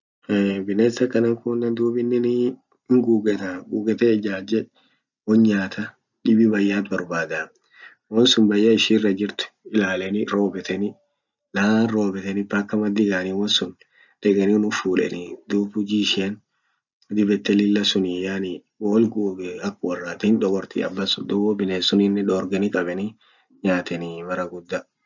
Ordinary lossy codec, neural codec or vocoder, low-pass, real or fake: none; none; 7.2 kHz; real